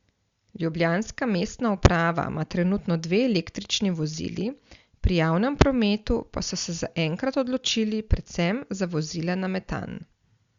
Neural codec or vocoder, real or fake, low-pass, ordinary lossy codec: none; real; 7.2 kHz; Opus, 64 kbps